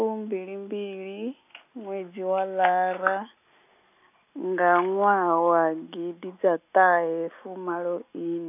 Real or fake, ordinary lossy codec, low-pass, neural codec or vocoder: real; none; 3.6 kHz; none